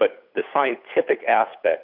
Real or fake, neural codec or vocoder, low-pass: fake; codec, 16 kHz, 8 kbps, FunCodec, trained on Chinese and English, 25 frames a second; 5.4 kHz